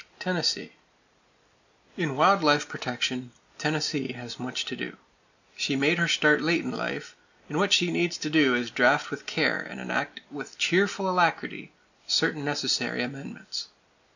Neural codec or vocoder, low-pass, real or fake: none; 7.2 kHz; real